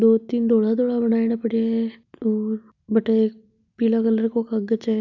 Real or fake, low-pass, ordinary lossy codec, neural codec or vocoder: real; 7.2 kHz; none; none